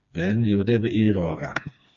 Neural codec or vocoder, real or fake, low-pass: codec, 16 kHz, 2 kbps, FreqCodec, smaller model; fake; 7.2 kHz